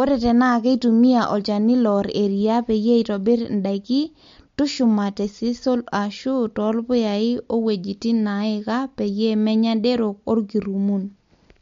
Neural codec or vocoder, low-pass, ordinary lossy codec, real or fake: none; 7.2 kHz; MP3, 48 kbps; real